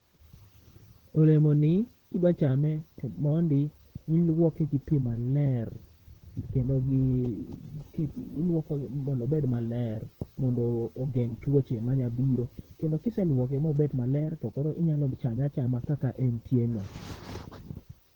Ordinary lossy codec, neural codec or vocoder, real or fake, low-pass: Opus, 16 kbps; vocoder, 44.1 kHz, 128 mel bands, Pupu-Vocoder; fake; 19.8 kHz